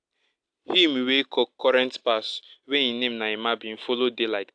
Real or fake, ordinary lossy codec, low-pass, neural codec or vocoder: real; AAC, 64 kbps; 9.9 kHz; none